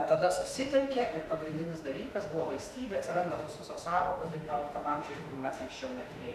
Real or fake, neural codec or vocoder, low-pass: fake; autoencoder, 48 kHz, 32 numbers a frame, DAC-VAE, trained on Japanese speech; 14.4 kHz